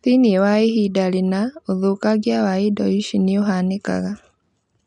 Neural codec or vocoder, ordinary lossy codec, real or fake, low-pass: none; MP3, 64 kbps; real; 10.8 kHz